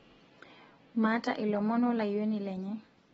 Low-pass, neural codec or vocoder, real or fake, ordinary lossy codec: 19.8 kHz; none; real; AAC, 24 kbps